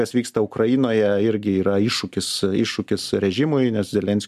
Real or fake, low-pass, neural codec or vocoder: real; 14.4 kHz; none